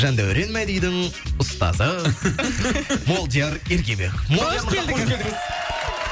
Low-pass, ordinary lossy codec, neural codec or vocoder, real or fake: none; none; none; real